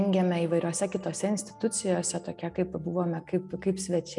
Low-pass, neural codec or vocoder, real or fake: 10.8 kHz; none; real